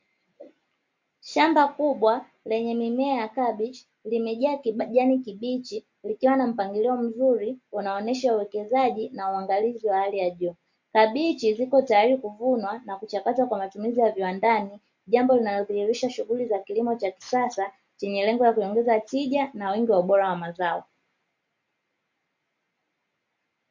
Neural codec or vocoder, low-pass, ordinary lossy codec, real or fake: none; 7.2 kHz; MP3, 48 kbps; real